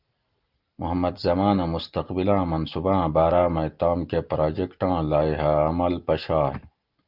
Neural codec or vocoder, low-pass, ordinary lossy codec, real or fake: none; 5.4 kHz; Opus, 32 kbps; real